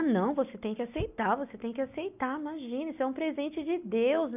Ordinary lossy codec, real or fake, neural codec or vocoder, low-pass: none; real; none; 3.6 kHz